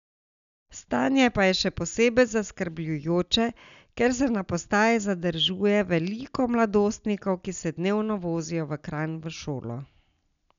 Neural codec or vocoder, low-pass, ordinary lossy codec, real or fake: none; 7.2 kHz; none; real